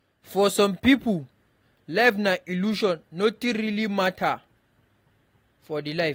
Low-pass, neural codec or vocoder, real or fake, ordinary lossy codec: 19.8 kHz; none; real; AAC, 48 kbps